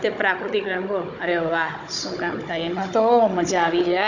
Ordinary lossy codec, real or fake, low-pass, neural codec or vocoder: none; fake; 7.2 kHz; codec, 16 kHz, 16 kbps, FunCodec, trained on Chinese and English, 50 frames a second